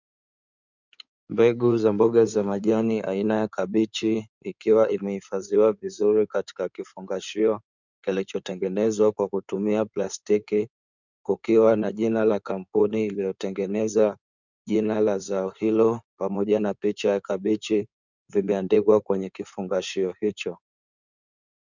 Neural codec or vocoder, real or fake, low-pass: codec, 16 kHz in and 24 kHz out, 2.2 kbps, FireRedTTS-2 codec; fake; 7.2 kHz